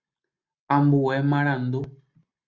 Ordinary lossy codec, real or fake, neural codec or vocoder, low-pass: Opus, 64 kbps; real; none; 7.2 kHz